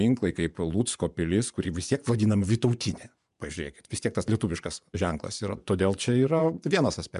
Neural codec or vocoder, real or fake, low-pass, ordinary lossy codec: vocoder, 24 kHz, 100 mel bands, Vocos; fake; 10.8 kHz; MP3, 96 kbps